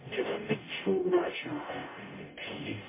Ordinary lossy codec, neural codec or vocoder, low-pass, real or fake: MP3, 16 kbps; codec, 44.1 kHz, 0.9 kbps, DAC; 3.6 kHz; fake